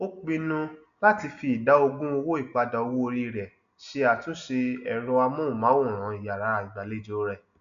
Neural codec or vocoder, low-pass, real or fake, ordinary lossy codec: none; 7.2 kHz; real; AAC, 96 kbps